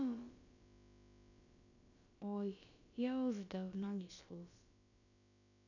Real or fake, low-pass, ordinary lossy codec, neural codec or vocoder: fake; 7.2 kHz; none; codec, 16 kHz, about 1 kbps, DyCAST, with the encoder's durations